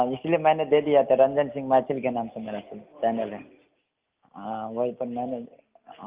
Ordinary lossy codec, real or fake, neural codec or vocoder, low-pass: Opus, 32 kbps; real; none; 3.6 kHz